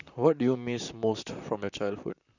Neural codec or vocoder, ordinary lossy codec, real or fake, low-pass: vocoder, 44.1 kHz, 128 mel bands, Pupu-Vocoder; none; fake; 7.2 kHz